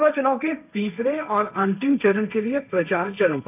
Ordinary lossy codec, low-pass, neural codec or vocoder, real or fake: none; 3.6 kHz; codec, 16 kHz, 1.1 kbps, Voila-Tokenizer; fake